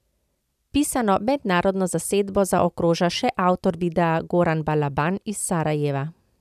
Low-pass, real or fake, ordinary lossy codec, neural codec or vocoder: 14.4 kHz; real; none; none